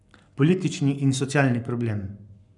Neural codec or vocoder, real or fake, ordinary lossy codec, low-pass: codec, 44.1 kHz, 7.8 kbps, Pupu-Codec; fake; none; 10.8 kHz